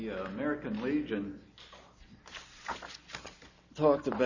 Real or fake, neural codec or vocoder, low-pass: real; none; 7.2 kHz